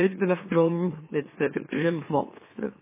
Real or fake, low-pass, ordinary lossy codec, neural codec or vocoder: fake; 3.6 kHz; MP3, 16 kbps; autoencoder, 44.1 kHz, a latent of 192 numbers a frame, MeloTTS